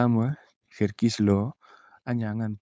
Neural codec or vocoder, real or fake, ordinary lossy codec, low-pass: codec, 16 kHz, 4.8 kbps, FACodec; fake; none; none